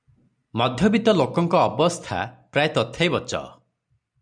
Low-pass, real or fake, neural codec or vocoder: 9.9 kHz; real; none